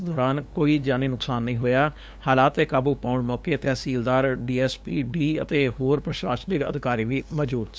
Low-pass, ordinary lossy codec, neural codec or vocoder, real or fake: none; none; codec, 16 kHz, 2 kbps, FunCodec, trained on LibriTTS, 25 frames a second; fake